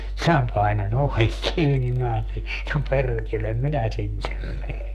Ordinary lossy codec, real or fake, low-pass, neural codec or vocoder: none; fake; 14.4 kHz; codec, 44.1 kHz, 2.6 kbps, SNAC